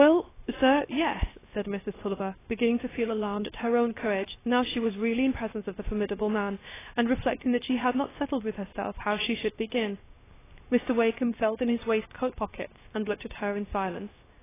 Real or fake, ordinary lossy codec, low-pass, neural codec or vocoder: fake; AAC, 16 kbps; 3.6 kHz; codec, 16 kHz, 0.7 kbps, FocalCodec